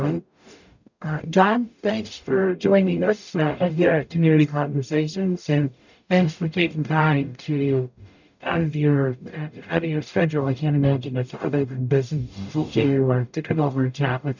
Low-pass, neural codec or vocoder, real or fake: 7.2 kHz; codec, 44.1 kHz, 0.9 kbps, DAC; fake